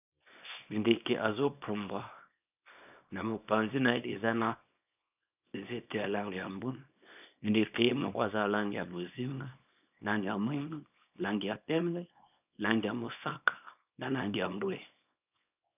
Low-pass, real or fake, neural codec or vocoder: 3.6 kHz; fake; codec, 24 kHz, 0.9 kbps, WavTokenizer, small release